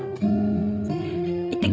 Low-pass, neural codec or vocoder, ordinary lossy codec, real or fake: none; codec, 16 kHz, 16 kbps, FreqCodec, larger model; none; fake